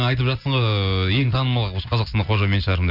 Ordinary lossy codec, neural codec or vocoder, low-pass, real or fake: none; none; 5.4 kHz; real